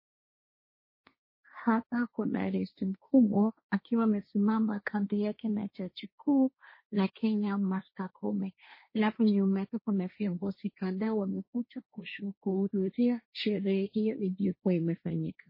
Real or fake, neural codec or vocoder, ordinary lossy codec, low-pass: fake; codec, 16 kHz, 1.1 kbps, Voila-Tokenizer; MP3, 24 kbps; 5.4 kHz